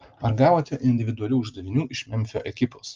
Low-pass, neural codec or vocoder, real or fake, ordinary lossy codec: 7.2 kHz; none; real; Opus, 24 kbps